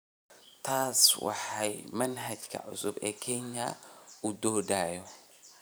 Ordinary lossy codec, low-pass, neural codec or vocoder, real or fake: none; none; vocoder, 44.1 kHz, 128 mel bands every 512 samples, BigVGAN v2; fake